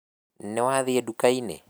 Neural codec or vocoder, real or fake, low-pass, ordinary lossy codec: none; real; none; none